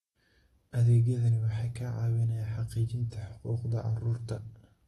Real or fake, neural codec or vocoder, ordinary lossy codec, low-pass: real; none; AAC, 32 kbps; 19.8 kHz